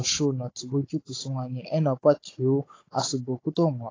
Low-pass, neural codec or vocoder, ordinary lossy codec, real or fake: 7.2 kHz; codec, 16 kHz, 16 kbps, FunCodec, trained on Chinese and English, 50 frames a second; AAC, 32 kbps; fake